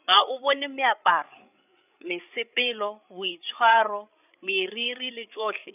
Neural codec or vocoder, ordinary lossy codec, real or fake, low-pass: codec, 16 kHz, 8 kbps, FreqCodec, larger model; none; fake; 3.6 kHz